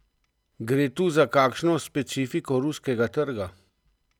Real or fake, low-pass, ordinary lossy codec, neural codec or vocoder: real; 19.8 kHz; none; none